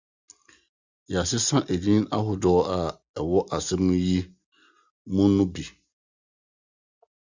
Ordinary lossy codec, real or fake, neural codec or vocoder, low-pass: Opus, 64 kbps; real; none; 7.2 kHz